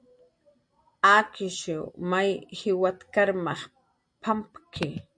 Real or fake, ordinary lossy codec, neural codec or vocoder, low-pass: real; MP3, 64 kbps; none; 9.9 kHz